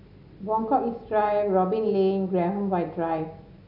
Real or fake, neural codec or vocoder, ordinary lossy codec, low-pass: real; none; none; 5.4 kHz